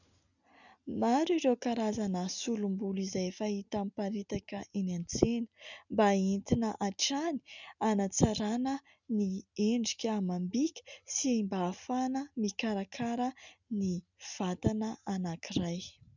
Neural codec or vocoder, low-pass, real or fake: none; 7.2 kHz; real